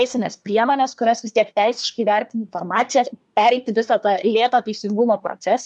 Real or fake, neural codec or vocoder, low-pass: fake; codec, 24 kHz, 1 kbps, SNAC; 10.8 kHz